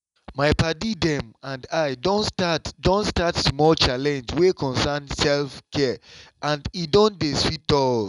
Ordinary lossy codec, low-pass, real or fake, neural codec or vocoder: none; 10.8 kHz; real; none